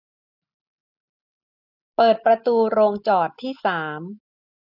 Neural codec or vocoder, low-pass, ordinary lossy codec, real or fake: none; 5.4 kHz; none; real